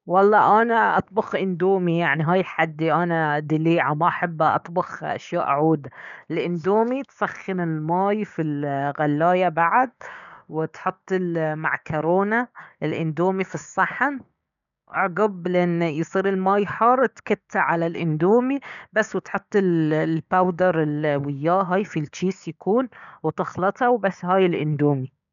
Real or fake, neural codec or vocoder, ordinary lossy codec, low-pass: fake; codec, 16 kHz, 6 kbps, DAC; none; 7.2 kHz